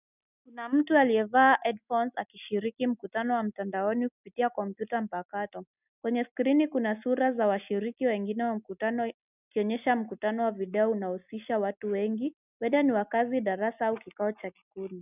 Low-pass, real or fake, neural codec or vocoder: 3.6 kHz; real; none